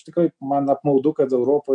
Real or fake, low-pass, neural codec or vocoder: real; 9.9 kHz; none